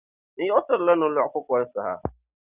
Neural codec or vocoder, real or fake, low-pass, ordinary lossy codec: none; real; 3.6 kHz; Opus, 64 kbps